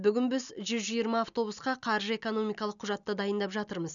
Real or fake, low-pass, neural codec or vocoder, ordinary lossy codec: real; 7.2 kHz; none; none